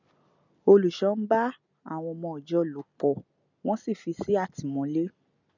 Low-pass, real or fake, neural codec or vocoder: 7.2 kHz; real; none